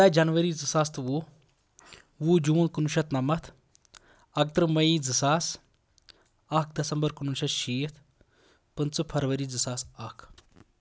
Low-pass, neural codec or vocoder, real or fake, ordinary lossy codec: none; none; real; none